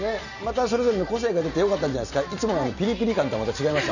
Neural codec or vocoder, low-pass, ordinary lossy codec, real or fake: none; 7.2 kHz; none; real